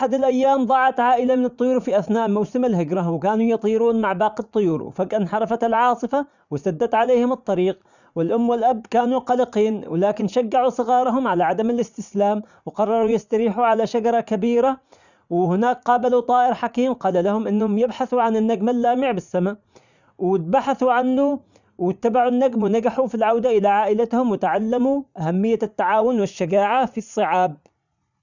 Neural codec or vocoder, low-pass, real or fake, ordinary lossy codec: vocoder, 44.1 kHz, 80 mel bands, Vocos; 7.2 kHz; fake; none